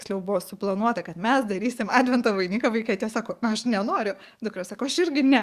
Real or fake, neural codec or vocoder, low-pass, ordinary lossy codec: fake; codec, 44.1 kHz, 7.8 kbps, DAC; 14.4 kHz; Opus, 64 kbps